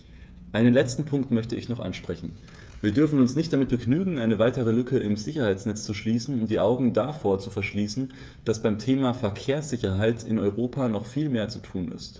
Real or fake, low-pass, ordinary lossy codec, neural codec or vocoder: fake; none; none; codec, 16 kHz, 8 kbps, FreqCodec, smaller model